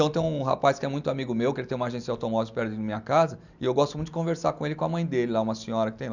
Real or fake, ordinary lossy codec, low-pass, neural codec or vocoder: real; none; 7.2 kHz; none